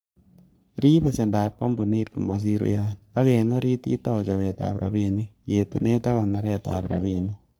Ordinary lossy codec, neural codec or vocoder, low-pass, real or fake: none; codec, 44.1 kHz, 3.4 kbps, Pupu-Codec; none; fake